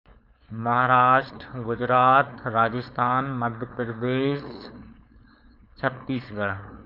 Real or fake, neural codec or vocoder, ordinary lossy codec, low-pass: fake; codec, 16 kHz, 4.8 kbps, FACodec; none; 5.4 kHz